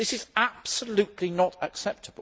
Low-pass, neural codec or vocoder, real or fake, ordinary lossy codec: none; none; real; none